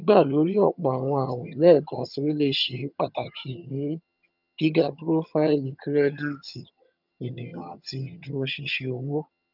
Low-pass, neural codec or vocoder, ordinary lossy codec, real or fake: 5.4 kHz; vocoder, 22.05 kHz, 80 mel bands, HiFi-GAN; none; fake